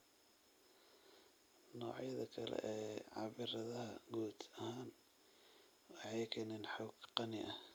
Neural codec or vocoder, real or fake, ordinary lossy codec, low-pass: none; real; none; none